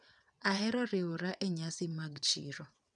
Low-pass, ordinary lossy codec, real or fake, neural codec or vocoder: 9.9 kHz; none; fake; vocoder, 22.05 kHz, 80 mel bands, WaveNeXt